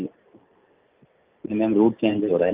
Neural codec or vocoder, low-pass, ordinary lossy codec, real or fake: none; 3.6 kHz; Opus, 24 kbps; real